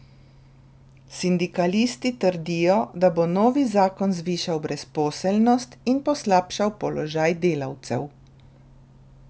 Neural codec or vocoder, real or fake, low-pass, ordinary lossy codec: none; real; none; none